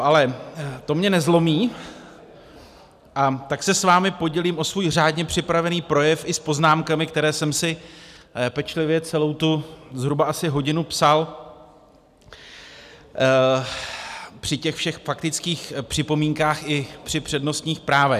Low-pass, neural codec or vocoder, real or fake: 14.4 kHz; none; real